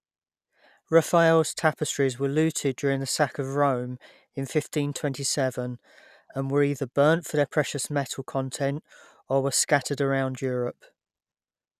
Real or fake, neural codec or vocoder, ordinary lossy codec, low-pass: real; none; none; 14.4 kHz